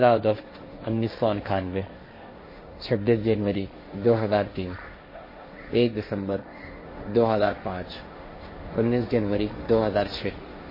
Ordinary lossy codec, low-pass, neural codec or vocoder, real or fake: MP3, 24 kbps; 5.4 kHz; codec, 16 kHz, 1.1 kbps, Voila-Tokenizer; fake